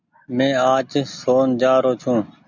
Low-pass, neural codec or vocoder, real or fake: 7.2 kHz; none; real